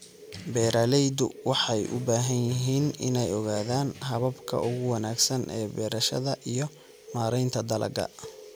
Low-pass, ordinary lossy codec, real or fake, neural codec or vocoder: none; none; real; none